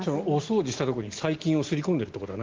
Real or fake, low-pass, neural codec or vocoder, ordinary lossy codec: real; 7.2 kHz; none; Opus, 16 kbps